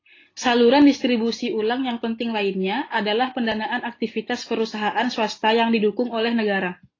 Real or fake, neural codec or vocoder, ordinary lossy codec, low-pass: real; none; AAC, 32 kbps; 7.2 kHz